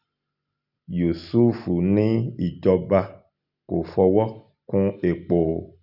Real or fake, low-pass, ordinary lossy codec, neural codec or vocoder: real; 5.4 kHz; none; none